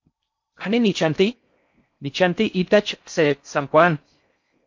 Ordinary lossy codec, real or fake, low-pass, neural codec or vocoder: MP3, 48 kbps; fake; 7.2 kHz; codec, 16 kHz in and 24 kHz out, 0.6 kbps, FocalCodec, streaming, 4096 codes